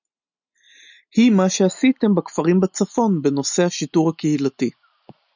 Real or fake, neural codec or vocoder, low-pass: real; none; 7.2 kHz